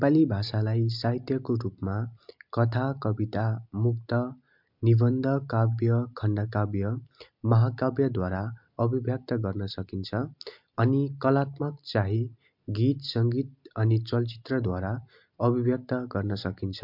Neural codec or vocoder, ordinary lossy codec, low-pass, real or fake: none; MP3, 48 kbps; 5.4 kHz; real